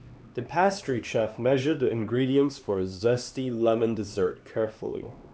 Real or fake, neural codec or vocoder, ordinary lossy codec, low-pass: fake; codec, 16 kHz, 2 kbps, X-Codec, HuBERT features, trained on LibriSpeech; none; none